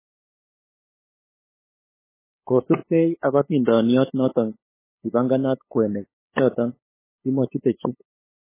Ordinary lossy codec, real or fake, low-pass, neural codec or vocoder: MP3, 16 kbps; fake; 3.6 kHz; codec, 16 kHz, 8 kbps, FunCodec, trained on LibriTTS, 25 frames a second